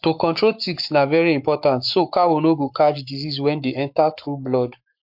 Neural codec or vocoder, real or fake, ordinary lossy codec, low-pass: codec, 44.1 kHz, 7.8 kbps, Pupu-Codec; fake; MP3, 48 kbps; 5.4 kHz